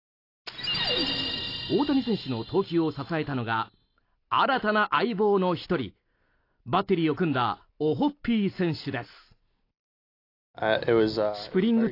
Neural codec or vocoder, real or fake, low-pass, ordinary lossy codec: none; real; 5.4 kHz; AAC, 32 kbps